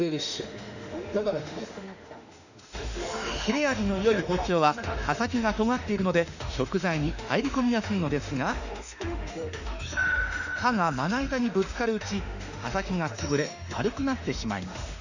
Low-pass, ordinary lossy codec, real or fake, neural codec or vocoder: 7.2 kHz; none; fake; autoencoder, 48 kHz, 32 numbers a frame, DAC-VAE, trained on Japanese speech